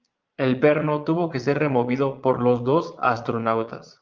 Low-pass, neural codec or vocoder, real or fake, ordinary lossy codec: 7.2 kHz; vocoder, 22.05 kHz, 80 mel bands, Vocos; fake; Opus, 32 kbps